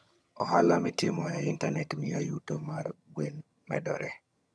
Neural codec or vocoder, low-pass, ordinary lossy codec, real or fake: vocoder, 22.05 kHz, 80 mel bands, HiFi-GAN; none; none; fake